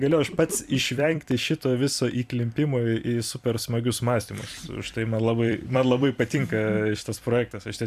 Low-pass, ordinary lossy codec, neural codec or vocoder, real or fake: 14.4 kHz; AAC, 96 kbps; vocoder, 44.1 kHz, 128 mel bands every 256 samples, BigVGAN v2; fake